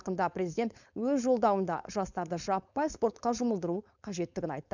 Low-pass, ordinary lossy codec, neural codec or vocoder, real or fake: 7.2 kHz; none; codec, 16 kHz, 4.8 kbps, FACodec; fake